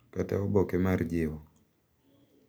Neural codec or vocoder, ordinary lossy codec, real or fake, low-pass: none; none; real; none